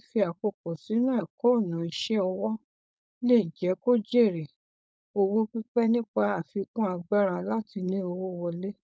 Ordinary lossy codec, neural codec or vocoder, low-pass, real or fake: none; codec, 16 kHz, 4.8 kbps, FACodec; none; fake